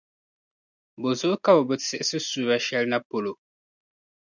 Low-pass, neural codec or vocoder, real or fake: 7.2 kHz; none; real